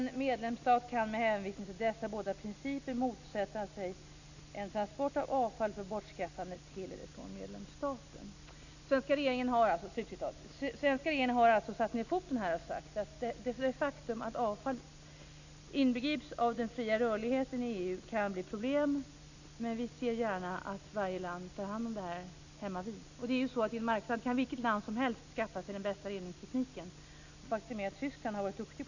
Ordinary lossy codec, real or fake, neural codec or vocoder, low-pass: none; real; none; 7.2 kHz